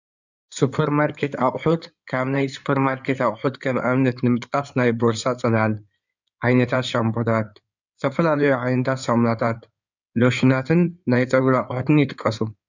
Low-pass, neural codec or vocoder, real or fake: 7.2 kHz; codec, 16 kHz in and 24 kHz out, 2.2 kbps, FireRedTTS-2 codec; fake